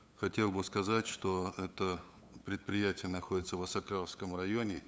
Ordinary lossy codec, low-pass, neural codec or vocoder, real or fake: none; none; codec, 16 kHz, 8 kbps, FunCodec, trained on LibriTTS, 25 frames a second; fake